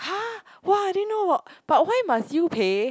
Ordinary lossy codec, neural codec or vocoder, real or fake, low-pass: none; none; real; none